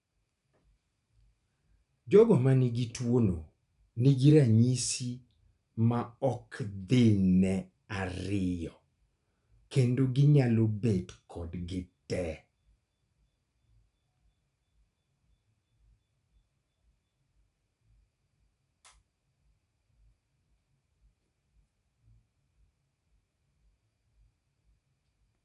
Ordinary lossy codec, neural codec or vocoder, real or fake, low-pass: none; none; real; 10.8 kHz